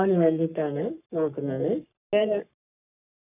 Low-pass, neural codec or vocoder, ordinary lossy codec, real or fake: 3.6 kHz; codec, 44.1 kHz, 3.4 kbps, Pupu-Codec; none; fake